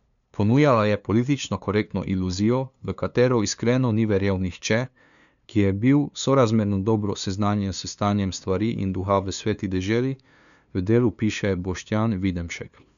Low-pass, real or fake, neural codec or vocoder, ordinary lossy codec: 7.2 kHz; fake; codec, 16 kHz, 2 kbps, FunCodec, trained on LibriTTS, 25 frames a second; none